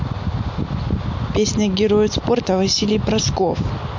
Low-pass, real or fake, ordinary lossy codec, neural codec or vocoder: 7.2 kHz; real; MP3, 48 kbps; none